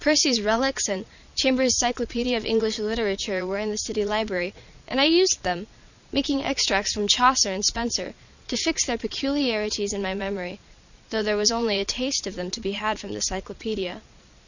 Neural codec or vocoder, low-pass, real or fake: vocoder, 22.05 kHz, 80 mel bands, Vocos; 7.2 kHz; fake